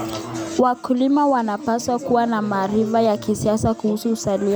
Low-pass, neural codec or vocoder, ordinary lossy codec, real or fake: none; none; none; real